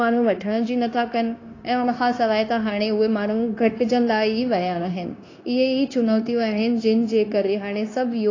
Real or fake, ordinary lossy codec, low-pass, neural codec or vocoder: fake; AAC, 32 kbps; 7.2 kHz; codec, 16 kHz, 0.9 kbps, LongCat-Audio-Codec